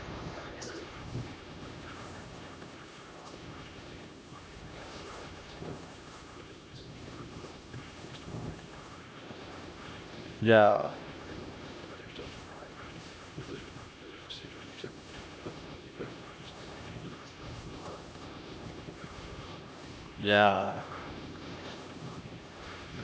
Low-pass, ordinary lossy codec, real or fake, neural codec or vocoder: none; none; fake; codec, 16 kHz, 1 kbps, X-Codec, HuBERT features, trained on LibriSpeech